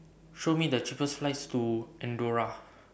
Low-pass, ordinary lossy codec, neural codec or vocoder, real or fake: none; none; none; real